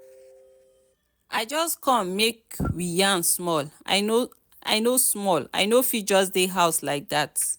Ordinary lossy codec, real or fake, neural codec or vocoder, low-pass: none; real; none; none